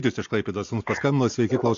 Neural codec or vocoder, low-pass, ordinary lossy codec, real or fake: none; 7.2 kHz; AAC, 48 kbps; real